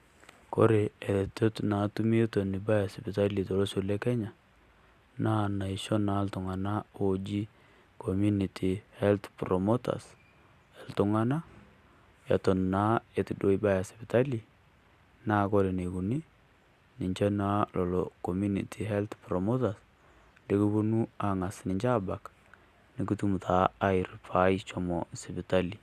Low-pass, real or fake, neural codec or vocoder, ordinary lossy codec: 14.4 kHz; real; none; Opus, 64 kbps